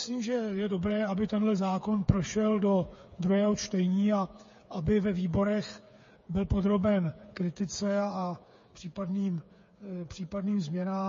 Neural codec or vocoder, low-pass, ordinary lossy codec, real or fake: codec, 16 kHz, 8 kbps, FreqCodec, smaller model; 7.2 kHz; MP3, 32 kbps; fake